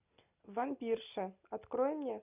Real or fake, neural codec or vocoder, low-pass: real; none; 3.6 kHz